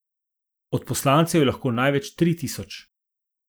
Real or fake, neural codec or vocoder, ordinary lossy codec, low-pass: real; none; none; none